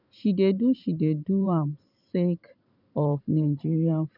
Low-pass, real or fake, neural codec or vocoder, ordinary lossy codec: 5.4 kHz; fake; vocoder, 44.1 kHz, 128 mel bands every 512 samples, BigVGAN v2; none